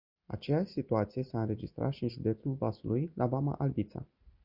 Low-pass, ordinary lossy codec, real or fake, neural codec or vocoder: 5.4 kHz; Opus, 64 kbps; real; none